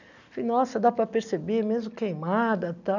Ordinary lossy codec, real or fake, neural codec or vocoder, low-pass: none; real; none; 7.2 kHz